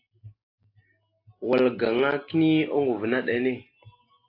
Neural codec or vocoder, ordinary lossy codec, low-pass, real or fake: none; AAC, 32 kbps; 5.4 kHz; real